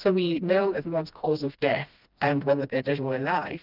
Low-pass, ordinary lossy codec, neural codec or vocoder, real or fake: 5.4 kHz; Opus, 32 kbps; codec, 16 kHz, 1 kbps, FreqCodec, smaller model; fake